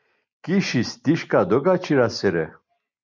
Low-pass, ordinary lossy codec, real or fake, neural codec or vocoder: 7.2 kHz; MP3, 64 kbps; real; none